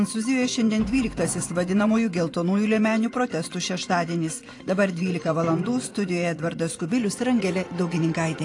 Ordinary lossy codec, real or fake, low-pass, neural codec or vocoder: AAC, 48 kbps; fake; 10.8 kHz; vocoder, 24 kHz, 100 mel bands, Vocos